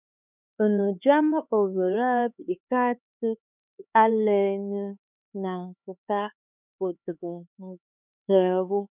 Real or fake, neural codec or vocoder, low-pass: fake; codec, 16 kHz, 4 kbps, X-Codec, HuBERT features, trained on LibriSpeech; 3.6 kHz